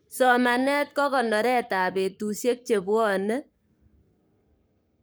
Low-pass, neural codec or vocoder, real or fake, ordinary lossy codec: none; codec, 44.1 kHz, 7.8 kbps, Pupu-Codec; fake; none